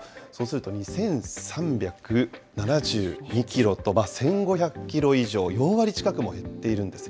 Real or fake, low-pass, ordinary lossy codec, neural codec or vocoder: real; none; none; none